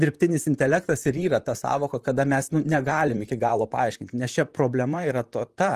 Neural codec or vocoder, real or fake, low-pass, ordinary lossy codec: vocoder, 44.1 kHz, 128 mel bands, Pupu-Vocoder; fake; 14.4 kHz; Opus, 24 kbps